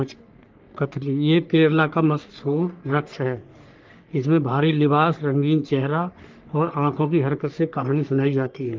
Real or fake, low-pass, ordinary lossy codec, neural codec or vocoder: fake; 7.2 kHz; Opus, 32 kbps; codec, 44.1 kHz, 3.4 kbps, Pupu-Codec